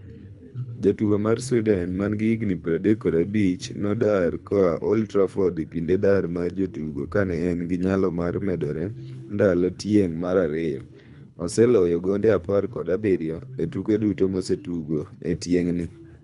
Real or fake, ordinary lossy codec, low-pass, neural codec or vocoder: fake; none; 10.8 kHz; codec, 24 kHz, 3 kbps, HILCodec